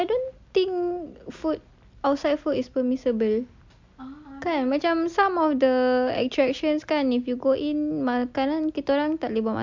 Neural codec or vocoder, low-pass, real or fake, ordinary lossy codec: none; 7.2 kHz; real; MP3, 64 kbps